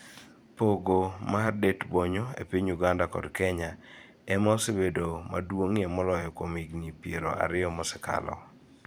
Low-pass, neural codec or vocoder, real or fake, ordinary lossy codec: none; vocoder, 44.1 kHz, 128 mel bands every 512 samples, BigVGAN v2; fake; none